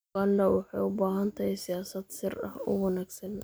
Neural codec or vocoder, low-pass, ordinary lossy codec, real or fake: none; none; none; real